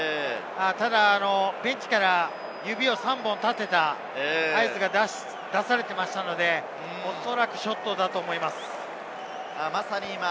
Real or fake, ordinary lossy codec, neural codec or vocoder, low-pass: real; none; none; none